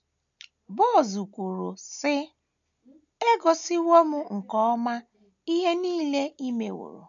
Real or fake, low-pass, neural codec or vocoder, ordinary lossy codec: real; 7.2 kHz; none; none